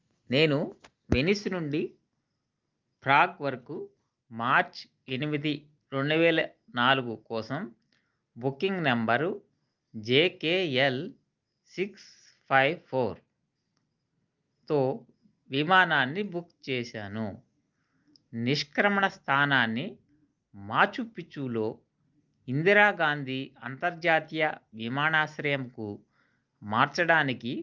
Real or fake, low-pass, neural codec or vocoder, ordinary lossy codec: real; 7.2 kHz; none; Opus, 24 kbps